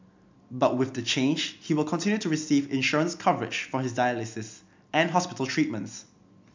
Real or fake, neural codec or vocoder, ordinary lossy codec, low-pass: real; none; MP3, 64 kbps; 7.2 kHz